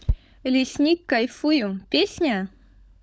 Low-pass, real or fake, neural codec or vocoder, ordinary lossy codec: none; fake; codec, 16 kHz, 16 kbps, FunCodec, trained on LibriTTS, 50 frames a second; none